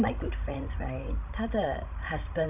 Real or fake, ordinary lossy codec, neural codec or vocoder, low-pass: fake; none; codec, 16 kHz, 16 kbps, FreqCodec, larger model; 3.6 kHz